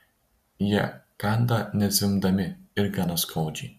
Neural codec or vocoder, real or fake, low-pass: none; real; 14.4 kHz